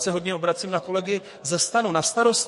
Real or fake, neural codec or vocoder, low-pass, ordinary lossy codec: fake; codec, 44.1 kHz, 2.6 kbps, SNAC; 14.4 kHz; MP3, 48 kbps